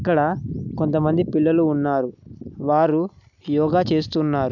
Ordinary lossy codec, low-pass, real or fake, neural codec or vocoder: none; 7.2 kHz; real; none